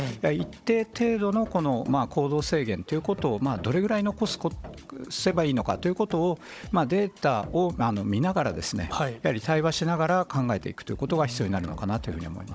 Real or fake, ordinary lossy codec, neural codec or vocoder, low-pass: fake; none; codec, 16 kHz, 16 kbps, FunCodec, trained on Chinese and English, 50 frames a second; none